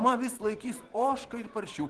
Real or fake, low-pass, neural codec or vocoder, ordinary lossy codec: fake; 10.8 kHz; vocoder, 24 kHz, 100 mel bands, Vocos; Opus, 16 kbps